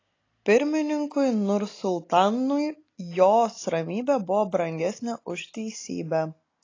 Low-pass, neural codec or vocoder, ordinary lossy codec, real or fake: 7.2 kHz; none; AAC, 32 kbps; real